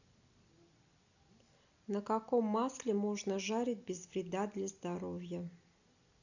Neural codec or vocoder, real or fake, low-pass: none; real; 7.2 kHz